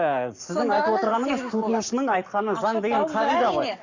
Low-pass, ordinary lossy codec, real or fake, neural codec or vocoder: 7.2 kHz; Opus, 64 kbps; fake; codec, 44.1 kHz, 7.8 kbps, Pupu-Codec